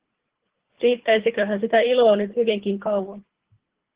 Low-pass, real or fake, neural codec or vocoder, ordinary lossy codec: 3.6 kHz; fake; codec, 24 kHz, 3 kbps, HILCodec; Opus, 16 kbps